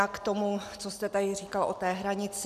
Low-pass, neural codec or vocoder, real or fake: 14.4 kHz; none; real